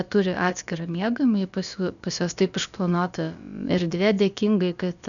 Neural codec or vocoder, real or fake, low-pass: codec, 16 kHz, about 1 kbps, DyCAST, with the encoder's durations; fake; 7.2 kHz